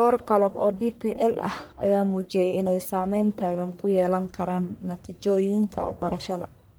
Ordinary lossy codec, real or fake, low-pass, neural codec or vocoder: none; fake; none; codec, 44.1 kHz, 1.7 kbps, Pupu-Codec